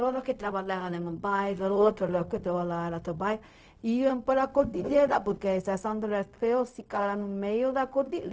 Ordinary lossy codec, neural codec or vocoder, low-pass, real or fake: none; codec, 16 kHz, 0.4 kbps, LongCat-Audio-Codec; none; fake